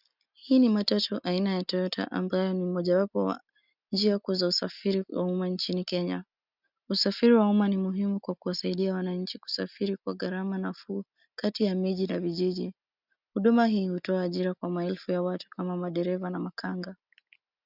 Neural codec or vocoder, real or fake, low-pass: none; real; 5.4 kHz